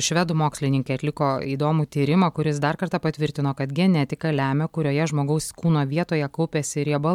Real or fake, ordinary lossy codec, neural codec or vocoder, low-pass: real; MP3, 96 kbps; none; 19.8 kHz